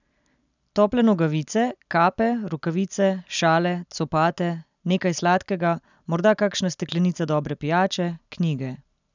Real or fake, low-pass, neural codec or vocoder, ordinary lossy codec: real; 7.2 kHz; none; none